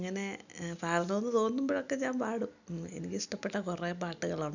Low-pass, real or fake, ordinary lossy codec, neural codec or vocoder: 7.2 kHz; real; none; none